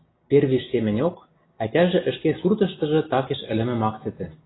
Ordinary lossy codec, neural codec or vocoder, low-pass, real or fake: AAC, 16 kbps; none; 7.2 kHz; real